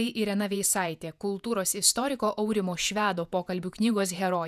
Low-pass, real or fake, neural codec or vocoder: 14.4 kHz; fake; vocoder, 48 kHz, 128 mel bands, Vocos